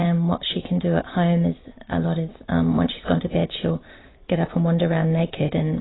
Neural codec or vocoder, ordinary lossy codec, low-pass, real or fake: none; AAC, 16 kbps; 7.2 kHz; real